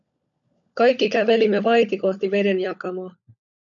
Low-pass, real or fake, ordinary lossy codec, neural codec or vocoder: 7.2 kHz; fake; MP3, 96 kbps; codec, 16 kHz, 16 kbps, FunCodec, trained on LibriTTS, 50 frames a second